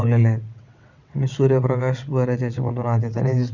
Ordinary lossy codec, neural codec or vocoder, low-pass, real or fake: none; vocoder, 22.05 kHz, 80 mel bands, WaveNeXt; 7.2 kHz; fake